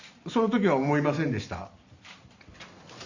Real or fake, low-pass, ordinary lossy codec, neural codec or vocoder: real; 7.2 kHz; Opus, 64 kbps; none